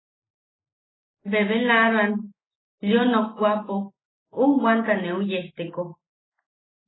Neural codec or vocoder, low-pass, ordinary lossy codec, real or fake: none; 7.2 kHz; AAC, 16 kbps; real